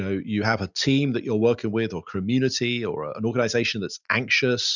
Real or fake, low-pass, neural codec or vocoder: real; 7.2 kHz; none